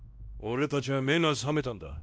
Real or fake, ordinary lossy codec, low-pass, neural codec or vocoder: fake; none; none; codec, 16 kHz, 1 kbps, X-Codec, WavLM features, trained on Multilingual LibriSpeech